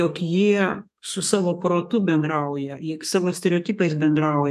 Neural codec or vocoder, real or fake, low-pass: codec, 32 kHz, 1.9 kbps, SNAC; fake; 14.4 kHz